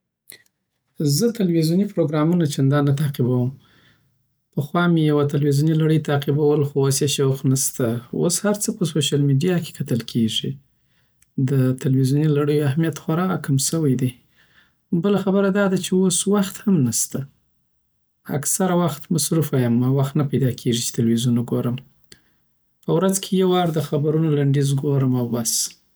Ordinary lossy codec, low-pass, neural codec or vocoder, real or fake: none; none; none; real